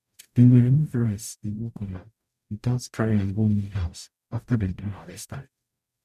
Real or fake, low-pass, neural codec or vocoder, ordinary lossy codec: fake; 14.4 kHz; codec, 44.1 kHz, 0.9 kbps, DAC; none